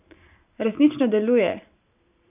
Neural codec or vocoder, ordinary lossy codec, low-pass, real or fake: none; none; 3.6 kHz; real